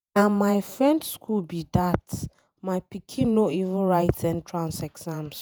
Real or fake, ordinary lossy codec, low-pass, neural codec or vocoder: fake; none; none; vocoder, 48 kHz, 128 mel bands, Vocos